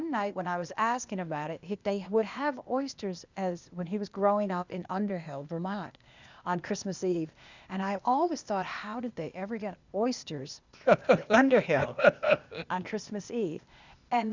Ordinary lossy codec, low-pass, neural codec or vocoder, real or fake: Opus, 64 kbps; 7.2 kHz; codec, 16 kHz, 0.8 kbps, ZipCodec; fake